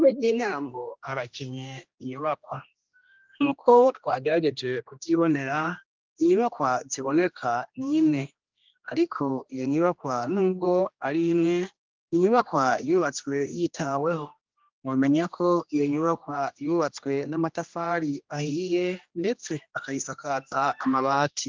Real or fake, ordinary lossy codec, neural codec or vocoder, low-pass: fake; Opus, 32 kbps; codec, 16 kHz, 1 kbps, X-Codec, HuBERT features, trained on general audio; 7.2 kHz